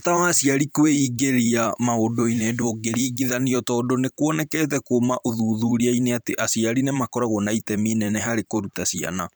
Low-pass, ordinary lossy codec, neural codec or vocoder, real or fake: none; none; vocoder, 44.1 kHz, 128 mel bands every 512 samples, BigVGAN v2; fake